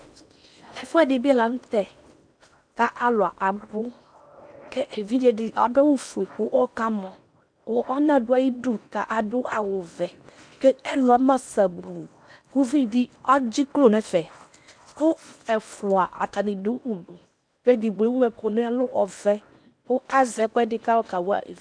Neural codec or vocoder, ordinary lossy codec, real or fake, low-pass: codec, 16 kHz in and 24 kHz out, 0.8 kbps, FocalCodec, streaming, 65536 codes; MP3, 96 kbps; fake; 9.9 kHz